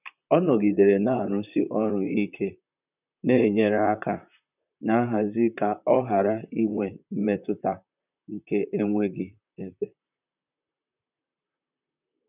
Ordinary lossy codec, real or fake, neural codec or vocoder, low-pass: none; fake; vocoder, 44.1 kHz, 128 mel bands, Pupu-Vocoder; 3.6 kHz